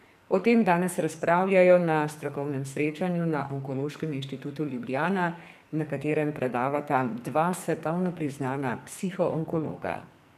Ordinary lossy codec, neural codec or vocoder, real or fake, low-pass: none; codec, 44.1 kHz, 2.6 kbps, SNAC; fake; 14.4 kHz